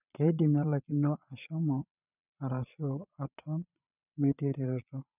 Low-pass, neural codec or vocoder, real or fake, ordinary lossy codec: 3.6 kHz; vocoder, 22.05 kHz, 80 mel bands, Vocos; fake; none